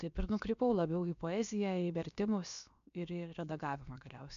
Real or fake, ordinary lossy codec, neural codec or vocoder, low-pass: fake; MP3, 96 kbps; codec, 16 kHz, about 1 kbps, DyCAST, with the encoder's durations; 7.2 kHz